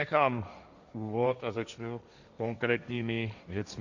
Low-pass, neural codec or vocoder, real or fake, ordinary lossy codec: 7.2 kHz; codec, 16 kHz, 1.1 kbps, Voila-Tokenizer; fake; Opus, 64 kbps